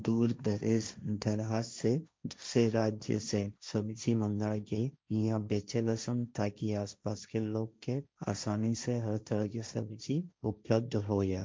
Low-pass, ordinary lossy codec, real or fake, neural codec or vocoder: none; none; fake; codec, 16 kHz, 1.1 kbps, Voila-Tokenizer